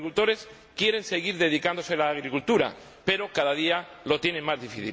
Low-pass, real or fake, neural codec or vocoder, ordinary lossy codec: none; real; none; none